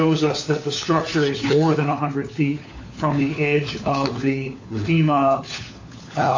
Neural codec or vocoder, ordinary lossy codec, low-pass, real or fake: codec, 16 kHz, 4 kbps, FunCodec, trained on LibriTTS, 50 frames a second; AAC, 48 kbps; 7.2 kHz; fake